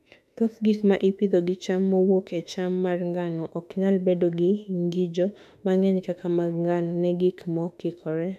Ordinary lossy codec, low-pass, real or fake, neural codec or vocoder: none; 14.4 kHz; fake; autoencoder, 48 kHz, 32 numbers a frame, DAC-VAE, trained on Japanese speech